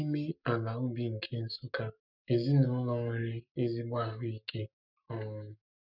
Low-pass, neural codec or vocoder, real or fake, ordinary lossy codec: 5.4 kHz; codec, 44.1 kHz, 7.8 kbps, Pupu-Codec; fake; none